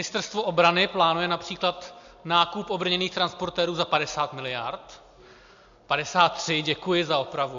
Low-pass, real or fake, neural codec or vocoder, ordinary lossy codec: 7.2 kHz; real; none; AAC, 64 kbps